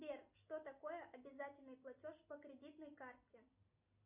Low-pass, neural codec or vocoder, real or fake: 3.6 kHz; none; real